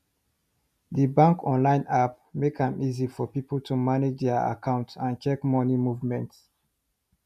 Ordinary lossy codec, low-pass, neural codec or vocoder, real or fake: none; 14.4 kHz; none; real